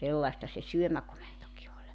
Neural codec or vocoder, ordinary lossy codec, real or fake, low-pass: none; none; real; none